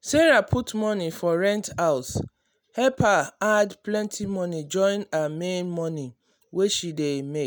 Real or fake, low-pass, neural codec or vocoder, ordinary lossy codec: real; none; none; none